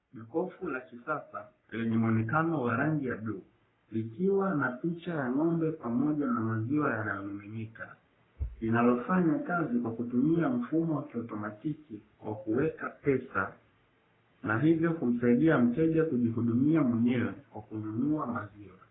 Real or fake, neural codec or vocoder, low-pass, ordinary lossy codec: fake; codec, 44.1 kHz, 3.4 kbps, Pupu-Codec; 7.2 kHz; AAC, 16 kbps